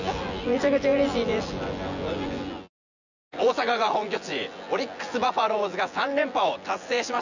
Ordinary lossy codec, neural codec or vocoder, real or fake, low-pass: none; vocoder, 24 kHz, 100 mel bands, Vocos; fake; 7.2 kHz